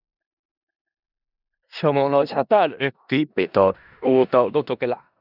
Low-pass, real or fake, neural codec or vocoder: 5.4 kHz; fake; codec, 16 kHz in and 24 kHz out, 0.4 kbps, LongCat-Audio-Codec, four codebook decoder